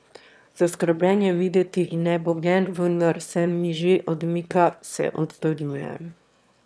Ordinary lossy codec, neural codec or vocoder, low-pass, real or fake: none; autoencoder, 22.05 kHz, a latent of 192 numbers a frame, VITS, trained on one speaker; none; fake